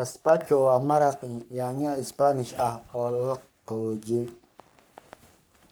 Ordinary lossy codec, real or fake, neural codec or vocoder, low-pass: none; fake; codec, 44.1 kHz, 3.4 kbps, Pupu-Codec; none